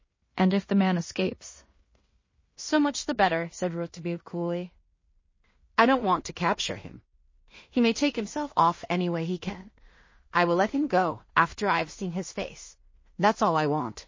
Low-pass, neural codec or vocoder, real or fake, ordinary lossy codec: 7.2 kHz; codec, 16 kHz in and 24 kHz out, 0.4 kbps, LongCat-Audio-Codec, two codebook decoder; fake; MP3, 32 kbps